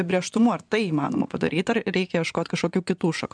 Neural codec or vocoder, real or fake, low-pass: vocoder, 24 kHz, 100 mel bands, Vocos; fake; 9.9 kHz